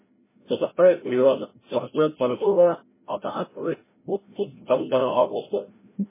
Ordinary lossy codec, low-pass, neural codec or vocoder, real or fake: MP3, 16 kbps; 3.6 kHz; codec, 16 kHz, 0.5 kbps, FreqCodec, larger model; fake